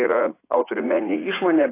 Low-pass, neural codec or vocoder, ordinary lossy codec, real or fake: 3.6 kHz; vocoder, 44.1 kHz, 80 mel bands, Vocos; AAC, 16 kbps; fake